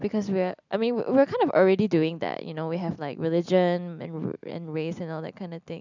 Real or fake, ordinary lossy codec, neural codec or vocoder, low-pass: real; none; none; 7.2 kHz